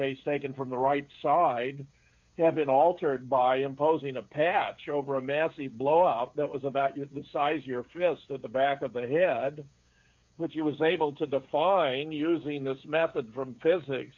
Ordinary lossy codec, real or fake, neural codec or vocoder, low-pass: MP3, 48 kbps; fake; codec, 16 kHz, 8 kbps, FreqCodec, smaller model; 7.2 kHz